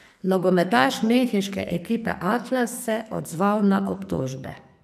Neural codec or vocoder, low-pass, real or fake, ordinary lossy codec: codec, 44.1 kHz, 2.6 kbps, SNAC; 14.4 kHz; fake; none